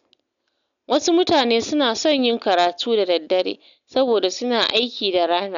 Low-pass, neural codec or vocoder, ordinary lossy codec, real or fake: 7.2 kHz; none; none; real